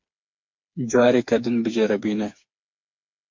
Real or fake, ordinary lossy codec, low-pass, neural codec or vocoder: fake; MP3, 48 kbps; 7.2 kHz; codec, 16 kHz, 4 kbps, FreqCodec, smaller model